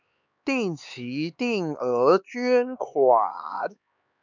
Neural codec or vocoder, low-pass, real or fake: codec, 16 kHz, 4 kbps, X-Codec, HuBERT features, trained on LibriSpeech; 7.2 kHz; fake